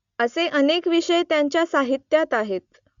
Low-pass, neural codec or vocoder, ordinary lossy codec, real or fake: 7.2 kHz; none; Opus, 64 kbps; real